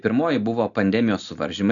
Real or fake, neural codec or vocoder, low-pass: real; none; 7.2 kHz